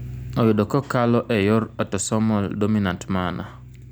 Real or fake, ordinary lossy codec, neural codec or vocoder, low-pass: real; none; none; none